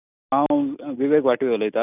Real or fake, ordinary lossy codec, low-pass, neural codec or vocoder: real; none; 3.6 kHz; none